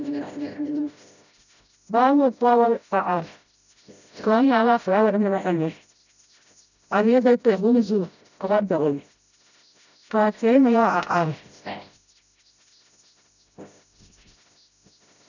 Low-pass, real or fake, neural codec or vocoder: 7.2 kHz; fake; codec, 16 kHz, 0.5 kbps, FreqCodec, smaller model